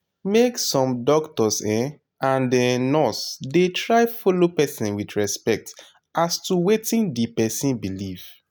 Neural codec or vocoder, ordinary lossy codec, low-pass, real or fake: none; none; none; real